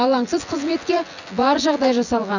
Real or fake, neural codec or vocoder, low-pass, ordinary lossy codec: fake; vocoder, 24 kHz, 100 mel bands, Vocos; 7.2 kHz; none